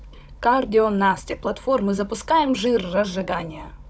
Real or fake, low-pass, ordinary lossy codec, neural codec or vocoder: fake; none; none; codec, 16 kHz, 16 kbps, FunCodec, trained on Chinese and English, 50 frames a second